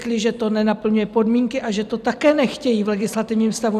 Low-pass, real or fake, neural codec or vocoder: 14.4 kHz; fake; vocoder, 44.1 kHz, 128 mel bands every 256 samples, BigVGAN v2